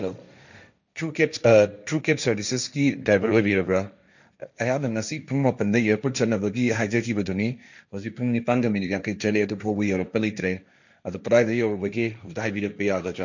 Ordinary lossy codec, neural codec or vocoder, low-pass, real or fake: none; codec, 16 kHz, 1.1 kbps, Voila-Tokenizer; 7.2 kHz; fake